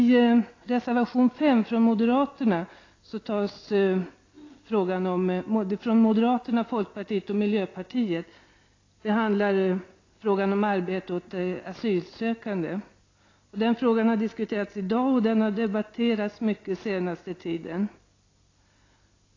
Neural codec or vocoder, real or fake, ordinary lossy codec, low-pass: none; real; AAC, 32 kbps; 7.2 kHz